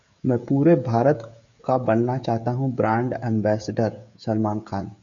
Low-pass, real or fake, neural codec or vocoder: 7.2 kHz; fake; codec, 16 kHz, 16 kbps, FreqCodec, smaller model